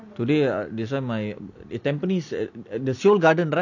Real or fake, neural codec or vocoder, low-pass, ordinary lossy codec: real; none; 7.2 kHz; none